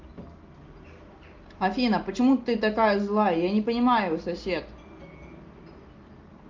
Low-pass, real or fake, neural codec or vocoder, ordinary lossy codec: 7.2 kHz; real; none; Opus, 32 kbps